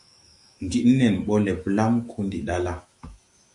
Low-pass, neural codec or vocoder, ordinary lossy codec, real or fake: 10.8 kHz; autoencoder, 48 kHz, 128 numbers a frame, DAC-VAE, trained on Japanese speech; MP3, 48 kbps; fake